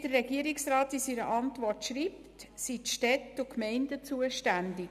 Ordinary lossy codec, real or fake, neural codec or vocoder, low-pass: none; real; none; 14.4 kHz